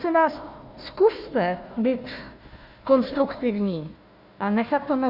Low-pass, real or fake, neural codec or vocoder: 5.4 kHz; fake; codec, 16 kHz, 1 kbps, FunCodec, trained on Chinese and English, 50 frames a second